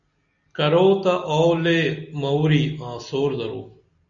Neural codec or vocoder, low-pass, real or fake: none; 7.2 kHz; real